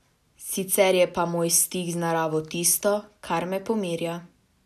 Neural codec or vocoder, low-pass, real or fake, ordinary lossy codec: none; 14.4 kHz; real; none